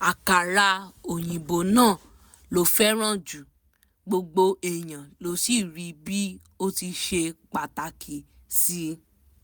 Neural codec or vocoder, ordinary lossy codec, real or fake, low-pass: none; none; real; none